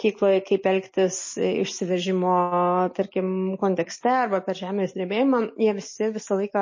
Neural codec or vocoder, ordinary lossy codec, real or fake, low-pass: none; MP3, 32 kbps; real; 7.2 kHz